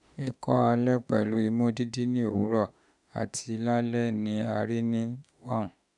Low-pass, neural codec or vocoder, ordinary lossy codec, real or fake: 10.8 kHz; autoencoder, 48 kHz, 32 numbers a frame, DAC-VAE, trained on Japanese speech; none; fake